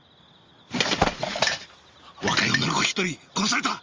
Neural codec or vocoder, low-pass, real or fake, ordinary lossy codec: none; 7.2 kHz; real; Opus, 32 kbps